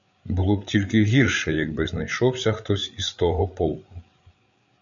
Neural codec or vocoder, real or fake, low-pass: codec, 16 kHz, 8 kbps, FreqCodec, larger model; fake; 7.2 kHz